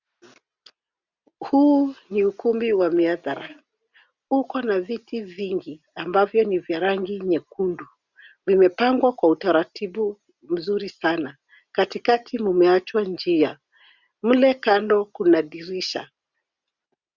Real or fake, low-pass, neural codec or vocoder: real; 7.2 kHz; none